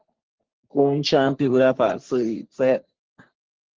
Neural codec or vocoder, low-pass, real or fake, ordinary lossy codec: codec, 44.1 kHz, 3.4 kbps, Pupu-Codec; 7.2 kHz; fake; Opus, 16 kbps